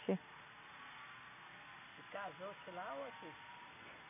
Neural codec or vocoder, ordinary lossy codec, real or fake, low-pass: none; none; real; 3.6 kHz